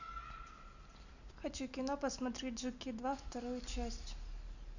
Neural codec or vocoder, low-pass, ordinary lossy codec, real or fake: none; 7.2 kHz; none; real